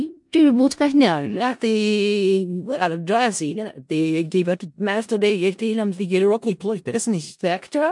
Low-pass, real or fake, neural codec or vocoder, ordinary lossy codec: 10.8 kHz; fake; codec, 16 kHz in and 24 kHz out, 0.4 kbps, LongCat-Audio-Codec, four codebook decoder; MP3, 64 kbps